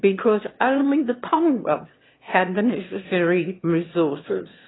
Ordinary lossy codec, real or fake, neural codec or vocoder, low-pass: AAC, 16 kbps; fake; autoencoder, 22.05 kHz, a latent of 192 numbers a frame, VITS, trained on one speaker; 7.2 kHz